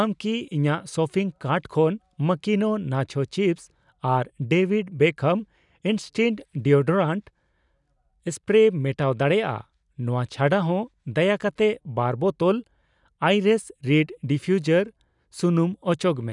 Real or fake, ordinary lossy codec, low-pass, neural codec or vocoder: real; none; 10.8 kHz; none